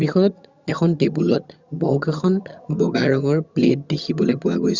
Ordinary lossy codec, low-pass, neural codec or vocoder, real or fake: Opus, 64 kbps; 7.2 kHz; vocoder, 22.05 kHz, 80 mel bands, HiFi-GAN; fake